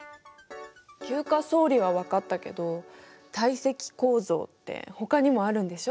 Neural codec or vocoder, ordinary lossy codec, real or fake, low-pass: none; none; real; none